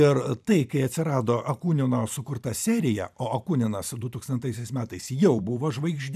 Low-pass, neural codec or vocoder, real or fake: 14.4 kHz; vocoder, 44.1 kHz, 128 mel bands every 512 samples, BigVGAN v2; fake